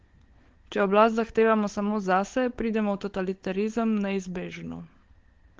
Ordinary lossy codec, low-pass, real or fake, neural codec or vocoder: Opus, 16 kbps; 7.2 kHz; fake; codec, 16 kHz, 16 kbps, FunCodec, trained on LibriTTS, 50 frames a second